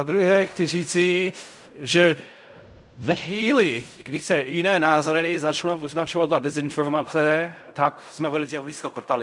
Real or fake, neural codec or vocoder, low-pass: fake; codec, 16 kHz in and 24 kHz out, 0.4 kbps, LongCat-Audio-Codec, fine tuned four codebook decoder; 10.8 kHz